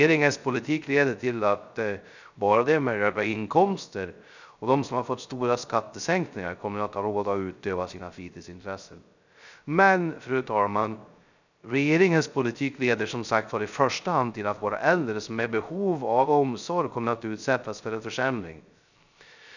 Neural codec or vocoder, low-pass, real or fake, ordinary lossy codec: codec, 16 kHz, 0.3 kbps, FocalCodec; 7.2 kHz; fake; none